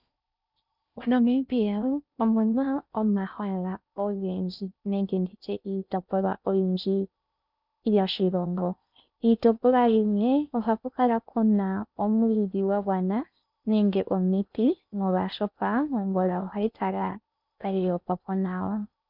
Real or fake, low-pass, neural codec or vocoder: fake; 5.4 kHz; codec, 16 kHz in and 24 kHz out, 0.6 kbps, FocalCodec, streaming, 4096 codes